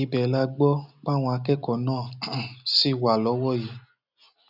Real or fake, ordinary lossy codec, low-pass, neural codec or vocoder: real; none; 5.4 kHz; none